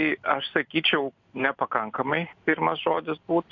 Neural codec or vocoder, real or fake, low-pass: none; real; 7.2 kHz